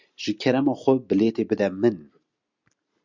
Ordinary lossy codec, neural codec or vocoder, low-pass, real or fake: Opus, 64 kbps; none; 7.2 kHz; real